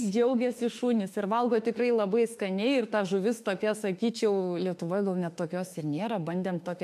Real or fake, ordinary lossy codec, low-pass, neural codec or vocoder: fake; MP3, 64 kbps; 14.4 kHz; autoencoder, 48 kHz, 32 numbers a frame, DAC-VAE, trained on Japanese speech